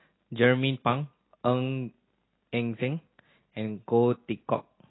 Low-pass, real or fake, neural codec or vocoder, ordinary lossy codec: 7.2 kHz; real; none; AAC, 16 kbps